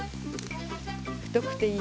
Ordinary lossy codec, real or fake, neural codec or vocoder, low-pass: none; real; none; none